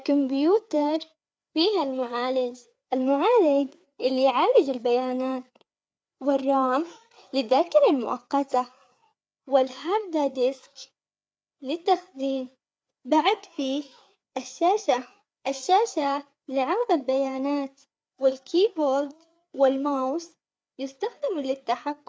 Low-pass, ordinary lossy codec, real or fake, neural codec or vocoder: none; none; fake; codec, 16 kHz, 4 kbps, FreqCodec, larger model